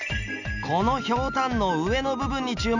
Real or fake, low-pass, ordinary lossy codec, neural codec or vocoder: real; 7.2 kHz; none; none